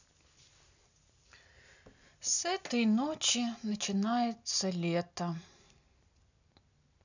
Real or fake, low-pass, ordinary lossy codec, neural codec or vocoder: real; 7.2 kHz; none; none